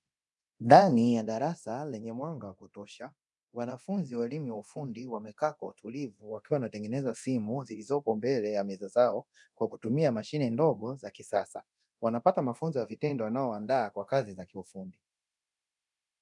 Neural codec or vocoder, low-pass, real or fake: codec, 24 kHz, 0.9 kbps, DualCodec; 10.8 kHz; fake